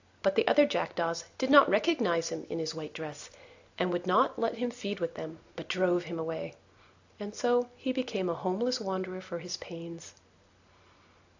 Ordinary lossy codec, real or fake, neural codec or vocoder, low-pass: AAC, 48 kbps; real; none; 7.2 kHz